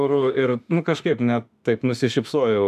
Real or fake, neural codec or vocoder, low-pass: fake; autoencoder, 48 kHz, 32 numbers a frame, DAC-VAE, trained on Japanese speech; 14.4 kHz